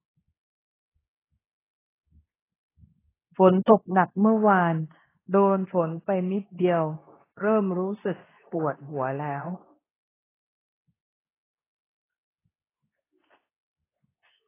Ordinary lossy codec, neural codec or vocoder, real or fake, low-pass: AAC, 16 kbps; codec, 24 kHz, 0.9 kbps, WavTokenizer, medium speech release version 2; fake; 3.6 kHz